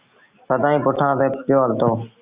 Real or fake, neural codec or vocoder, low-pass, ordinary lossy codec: real; none; 3.6 kHz; Opus, 64 kbps